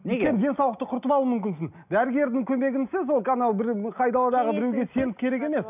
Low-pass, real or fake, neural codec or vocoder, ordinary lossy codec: 3.6 kHz; real; none; none